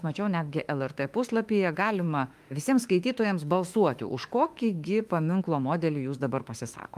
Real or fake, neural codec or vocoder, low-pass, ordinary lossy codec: fake; autoencoder, 48 kHz, 32 numbers a frame, DAC-VAE, trained on Japanese speech; 14.4 kHz; Opus, 32 kbps